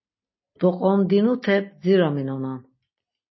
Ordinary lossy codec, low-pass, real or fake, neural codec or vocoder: MP3, 24 kbps; 7.2 kHz; real; none